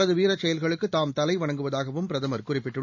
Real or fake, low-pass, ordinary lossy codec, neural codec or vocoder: real; 7.2 kHz; none; none